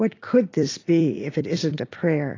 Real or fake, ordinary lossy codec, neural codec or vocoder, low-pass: fake; AAC, 32 kbps; vocoder, 44.1 kHz, 128 mel bands every 256 samples, BigVGAN v2; 7.2 kHz